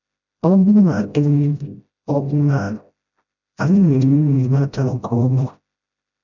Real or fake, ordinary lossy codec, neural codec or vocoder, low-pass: fake; none; codec, 16 kHz, 0.5 kbps, FreqCodec, smaller model; 7.2 kHz